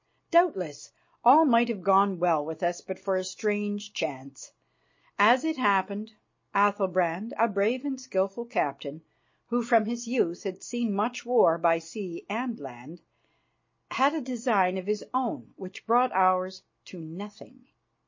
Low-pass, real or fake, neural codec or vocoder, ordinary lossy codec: 7.2 kHz; real; none; MP3, 32 kbps